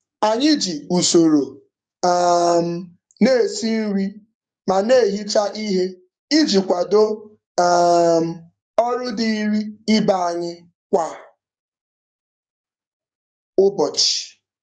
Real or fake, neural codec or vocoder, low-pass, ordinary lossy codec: fake; codec, 44.1 kHz, 7.8 kbps, DAC; 9.9 kHz; none